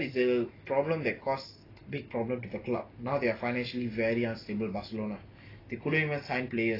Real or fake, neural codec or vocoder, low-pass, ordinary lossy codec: real; none; 5.4 kHz; AAC, 24 kbps